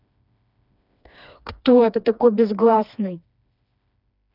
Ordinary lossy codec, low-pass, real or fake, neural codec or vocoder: none; 5.4 kHz; fake; codec, 16 kHz, 2 kbps, FreqCodec, smaller model